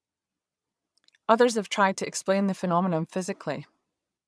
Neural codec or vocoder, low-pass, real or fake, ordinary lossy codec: vocoder, 22.05 kHz, 80 mel bands, Vocos; none; fake; none